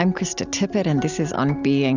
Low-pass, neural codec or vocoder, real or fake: 7.2 kHz; none; real